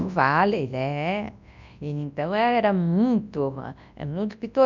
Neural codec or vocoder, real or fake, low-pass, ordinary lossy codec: codec, 24 kHz, 0.9 kbps, WavTokenizer, large speech release; fake; 7.2 kHz; none